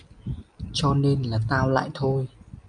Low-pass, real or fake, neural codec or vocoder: 9.9 kHz; real; none